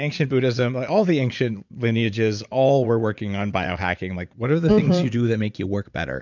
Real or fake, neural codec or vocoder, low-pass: fake; vocoder, 44.1 kHz, 80 mel bands, Vocos; 7.2 kHz